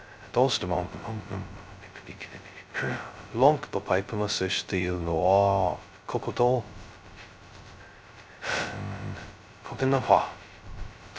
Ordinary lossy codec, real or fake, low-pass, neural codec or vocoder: none; fake; none; codec, 16 kHz, 0.2 kbps, FocalCodec